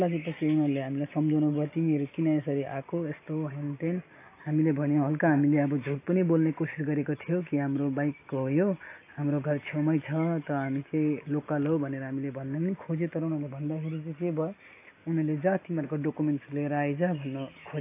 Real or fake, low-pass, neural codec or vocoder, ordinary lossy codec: real; 3.6 kHz; none; AAC, 24 kbps